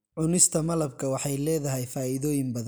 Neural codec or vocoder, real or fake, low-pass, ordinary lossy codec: none; real; none; none